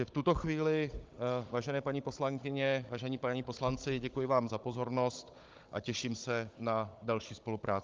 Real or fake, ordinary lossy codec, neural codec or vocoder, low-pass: fake; Opus, 24 kbps; codec, 16 kHz, 16 kbps, FunCodec, trained on Chinese and English, 50 frames a second; 7.2 kHz